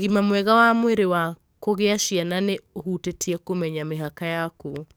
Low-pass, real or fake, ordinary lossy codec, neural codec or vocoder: none; fake; none; codec, 44.1 kHz, 7.8 kbps, DAC